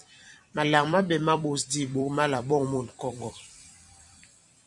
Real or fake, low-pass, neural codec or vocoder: fake; 10.8 kHz; vocoder, 44.1 kHz, 128 mel bands every 256 samples, BigVGAN v2